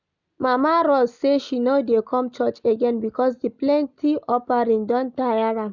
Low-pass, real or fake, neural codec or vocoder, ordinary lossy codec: 7.2 kHz; real; none; none